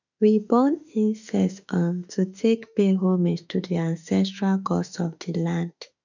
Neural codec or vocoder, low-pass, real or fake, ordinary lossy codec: autoencoder, 48 kHz, 32 numbers a frame, DAC-VAE, trained on Japanese speech; 7.2 kHz; fake; none